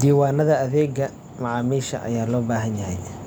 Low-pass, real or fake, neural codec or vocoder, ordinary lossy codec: none; real; none; none